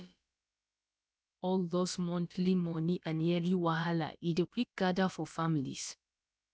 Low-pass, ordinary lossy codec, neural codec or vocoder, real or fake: none; none; codec, 16 kHz, about 1 kbps, DyCAST, with the encoder's durations; fake